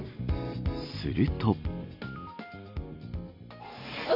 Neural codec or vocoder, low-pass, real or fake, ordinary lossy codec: none; 5.4 kHz; real; none